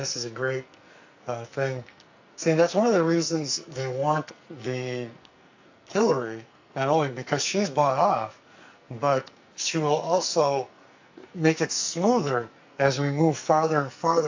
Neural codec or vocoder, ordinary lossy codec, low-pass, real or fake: codec, 44.1 kHz, 2.6 kbps, SNAC; AAC, 48 kbps; 7.2 kHz; fake